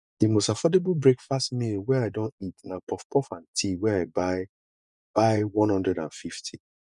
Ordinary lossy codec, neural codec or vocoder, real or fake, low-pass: none; vocoder, 24 kHz, 100 mel bands, Vocos; fake; 10.8 kHz